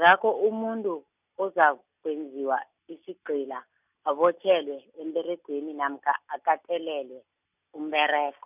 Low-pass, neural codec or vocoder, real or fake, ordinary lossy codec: 3.6 kHz; none; real; none